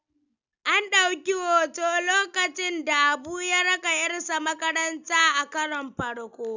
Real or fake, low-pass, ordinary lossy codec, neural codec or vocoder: real; 7.2 kHz; none; none